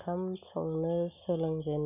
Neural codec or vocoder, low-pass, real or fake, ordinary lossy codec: none; 3.6 kHz; real; none